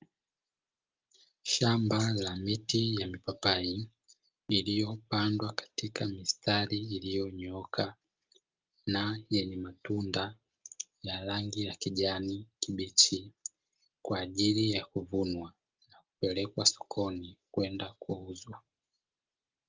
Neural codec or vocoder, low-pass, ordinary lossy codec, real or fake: none; 7.2 kHz; Opus, 24 kbps; real